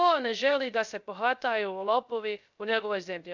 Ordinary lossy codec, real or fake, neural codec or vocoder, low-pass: none; fake; codec, 16 kHz, 0.3 kbps, FocalCodec; 7.2 kHz